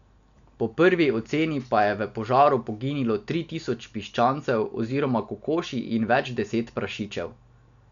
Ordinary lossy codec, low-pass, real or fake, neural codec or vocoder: none; 7.2 kHz; real; none